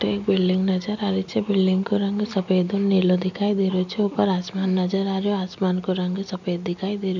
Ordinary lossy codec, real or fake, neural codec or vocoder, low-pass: none; real; none; 7.2 kHz